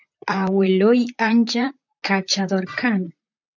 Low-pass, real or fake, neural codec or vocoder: 7.2 kHz; fake; vocoder, 44.1 kHz, 128 mel bands, Pupu-Vocoder